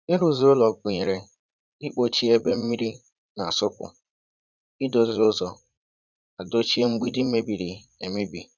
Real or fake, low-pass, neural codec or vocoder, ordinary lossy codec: fake; 7.2 kHz; vocoder, 44.1 kHz, 80 mel bands, Vocos; none